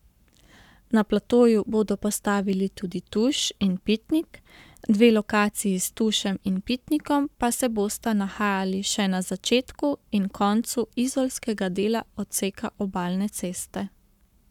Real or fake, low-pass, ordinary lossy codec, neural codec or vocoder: fake; 19.8 kHz; none; codec, 44.1 kHz, 7.8 kbps, Pupu-Codec